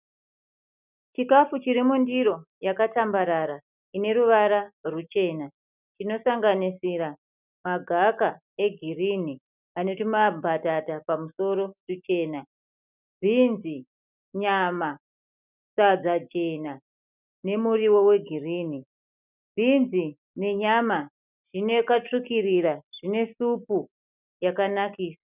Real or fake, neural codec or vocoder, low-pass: real; none; 3.6 kHz